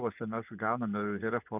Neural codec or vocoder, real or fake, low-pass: vocoder, 24 kHz, 100 mel bands, Vocos; fake; 3.6 kHz